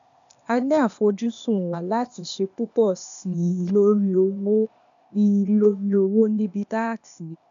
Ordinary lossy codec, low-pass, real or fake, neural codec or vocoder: none; 7.2 kHz; fake; codec, 16 kHz, 0.8 kbps, ZipCodec